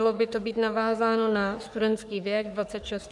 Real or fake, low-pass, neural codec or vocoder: fake; 10.8 kHz; codec, 44.1 kHz, 3.4 kbps, Pupu-Codec